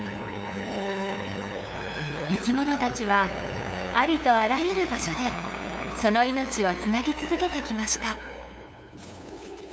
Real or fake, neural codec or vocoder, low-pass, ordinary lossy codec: fake; codec, 16 kHz, 2 kbps, FunCodec, trained on LibriTTS, 25 frames a second; none; none